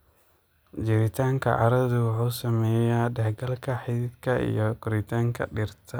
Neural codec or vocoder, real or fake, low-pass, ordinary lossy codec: none; real; none; none